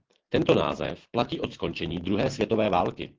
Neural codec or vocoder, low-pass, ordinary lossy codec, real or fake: autoencoder, 48 kHz, 128 numbers a frame, DAC-VAE, trained on Japanese speech; 7.2 kHz; Opus, 16 kbps; fake